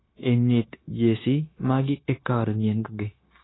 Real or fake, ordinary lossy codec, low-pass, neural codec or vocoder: fake; AAC, 16 kbps; 7.2 kHz; codec, 16 kHz, 0.9 kbps, LongCat-Audio-Codec